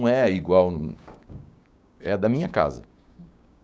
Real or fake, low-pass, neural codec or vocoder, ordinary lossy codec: fake; none; codec, 16 kHz, 6 kbps, DAC; none